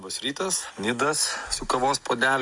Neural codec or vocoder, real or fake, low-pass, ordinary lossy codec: none; real; 10.8 kHz; Opus, 64 kbps